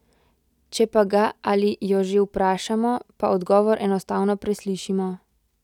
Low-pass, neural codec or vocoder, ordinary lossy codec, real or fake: 19.8 kHz; none; none; real